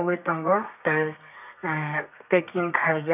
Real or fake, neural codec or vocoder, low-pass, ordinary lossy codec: fake; codec, 32 kHz, 1.9 kbps, SNAC; 3.6 kHz; none